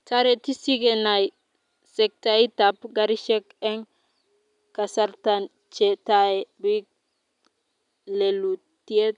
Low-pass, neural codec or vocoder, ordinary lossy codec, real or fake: 10.8 kHz; none; none; real